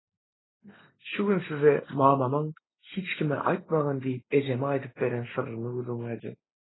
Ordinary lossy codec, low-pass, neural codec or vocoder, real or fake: AAC, 16 kbps; 7.2 kHz; none; real